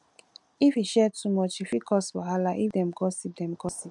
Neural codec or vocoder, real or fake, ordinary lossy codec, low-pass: none; real; none; 10.8 kHz